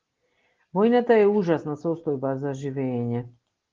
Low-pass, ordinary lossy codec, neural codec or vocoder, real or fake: 7.2 kHz; Opus, 16 kbps; none; real